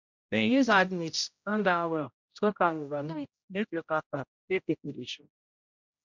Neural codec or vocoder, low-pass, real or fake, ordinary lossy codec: codec, 16 kHz, 0.5 kbps, X-Codec, HuBERT features, trained on general audio; 7.2 kHz; fake; MP3, 64 kbps